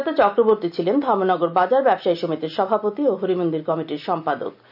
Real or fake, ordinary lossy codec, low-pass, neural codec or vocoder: real; none; 5.4 kHz; none